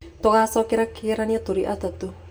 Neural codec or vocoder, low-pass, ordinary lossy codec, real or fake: vocoder, 44.1 kHz, 128 mel bands every 256 samples, BigVGAN v2; none; none; fake